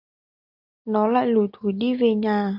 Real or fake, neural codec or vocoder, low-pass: real; none; 5.4 kHz